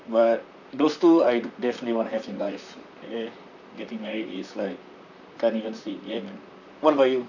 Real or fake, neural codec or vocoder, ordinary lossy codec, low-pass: fake; vocoder, 44.1 kHz, 128 mel bands, Pupu-Vocoder; none; 7.2 kHz